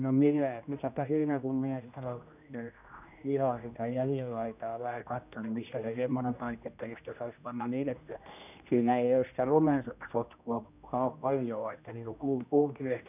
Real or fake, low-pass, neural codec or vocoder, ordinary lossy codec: fake; 3.6 kHz; codec, 16 kHz, 1 kbps, X-Codec, HuBERT features, trained on general audio; none